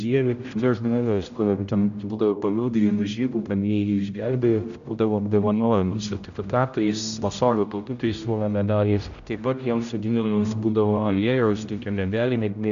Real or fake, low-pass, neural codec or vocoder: fake; 7.2 kHz; codec, 16 kHz, 0.5 kbps, X-Codec, HuBERT features, trained on general audio